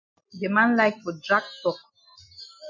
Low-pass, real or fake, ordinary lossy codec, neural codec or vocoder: 7.2 kHz; real; MP3, 64 kbps; none